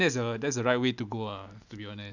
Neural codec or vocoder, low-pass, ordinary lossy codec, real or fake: none; 7.2 kHz; none; real